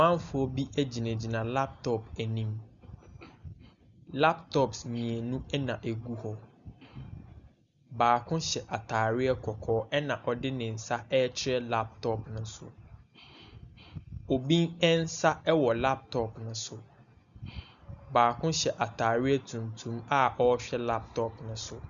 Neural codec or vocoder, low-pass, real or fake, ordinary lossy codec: none; 7.2 kHz; real; Opus, 64 kbps